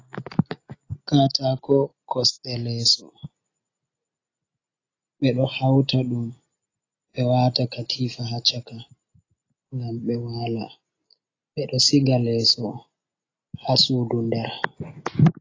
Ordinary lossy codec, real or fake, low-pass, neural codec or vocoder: AAC, 32 kbps; real; 7.2 kHz; none